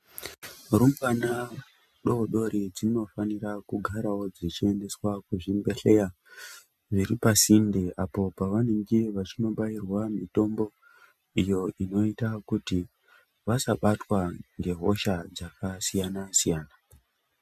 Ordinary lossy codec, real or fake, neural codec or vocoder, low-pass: MP3, 96 kbps; real; none; 14.4 kHz